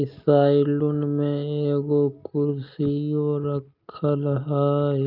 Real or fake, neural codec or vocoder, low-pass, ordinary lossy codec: real; none; 5.4 kHz; Opus, 24 kbps